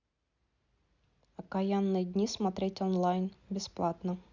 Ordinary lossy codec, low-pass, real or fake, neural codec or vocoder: Opus, 64 kbps; 7.2 kHz; real; none